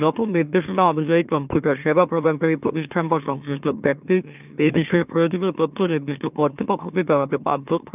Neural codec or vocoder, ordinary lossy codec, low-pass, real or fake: autoencoder, 44.1 kHz, a latent of 192 numbers a frame, MeloTTS; none; 3.6 kHz; fake